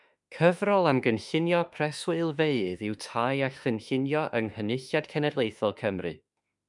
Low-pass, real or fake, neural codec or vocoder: 10.8 kHz; fake; autoencoder, 48 kHz, 32 numbers a frame, DAC-VAE, trained on Japanese speech